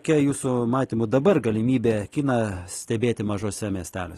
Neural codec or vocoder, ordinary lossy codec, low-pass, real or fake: none; AAC, 32 kbps; 19.8 kHz; real